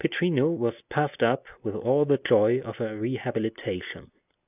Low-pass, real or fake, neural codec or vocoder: 3.6 kHz; real; none